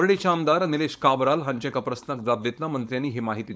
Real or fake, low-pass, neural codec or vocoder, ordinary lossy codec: fake; none; codec, 16 kHz, 4.8 kbps, FACodec; none